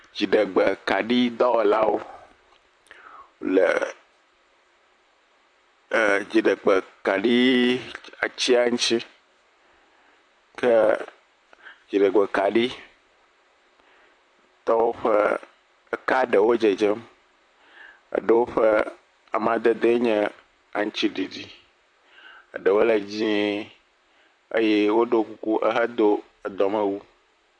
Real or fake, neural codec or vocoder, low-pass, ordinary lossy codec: fake; vocoder, 44.1 kHz, 128 mel bands, Pupu-Vocoder; 9.9 kHz; AAC, 64 kbps